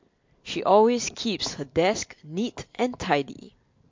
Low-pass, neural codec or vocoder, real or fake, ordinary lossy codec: 7.2 kHz; none; real; MP3, 48 kbps